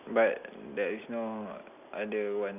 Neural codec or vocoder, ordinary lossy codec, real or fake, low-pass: none; none; real; 3.6 kHz